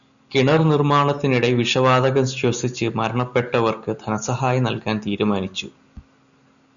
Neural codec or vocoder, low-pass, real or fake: none; 7.2 kHz; real